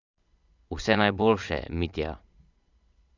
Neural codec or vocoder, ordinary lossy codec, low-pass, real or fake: vocoder, 22.05 kHz, 80 mel bands, Vocos; none; 7.2 kHz; fake